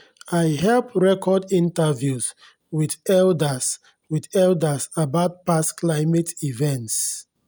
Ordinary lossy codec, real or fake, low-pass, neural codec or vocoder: none; real; none; none